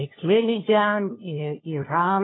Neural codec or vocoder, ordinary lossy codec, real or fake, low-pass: codec, 16 kHz, 1 kbps, FunCodec, trained on LibriTTS, 50 frames a second; AAC, 16 kbps; fake; 7.2 kHz